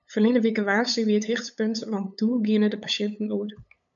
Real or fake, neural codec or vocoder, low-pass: fake; codec, 16 kHz, 8 kbps, FunCodec, trained on LibriTTS, 25 frames a second; 7.2 kHz